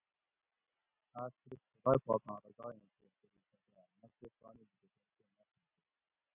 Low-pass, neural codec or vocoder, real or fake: 3.6 kHz; none; real